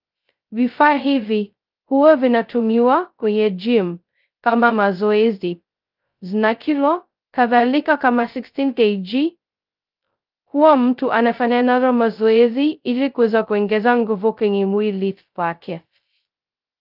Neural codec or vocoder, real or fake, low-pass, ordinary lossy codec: codec, 16 kHz, 0.2 kbps, FocalCodec; fake; 5.4 kHz; Opus, 24 kbps